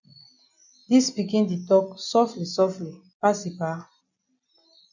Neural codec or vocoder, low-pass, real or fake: none; 7.2 kHz; real